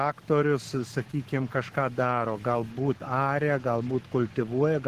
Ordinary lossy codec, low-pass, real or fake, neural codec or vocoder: Opus, 16 kbps; 14.4 kHz; fake; codec, 44.1 kHz, 7.8 kbps, Pupu-Codec